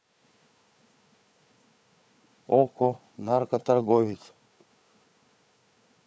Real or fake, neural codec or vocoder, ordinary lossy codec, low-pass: fake; codec, 16 kHz, 4 kbps, FunCodec, trained on Chinese and English, 50 frames a second; none; none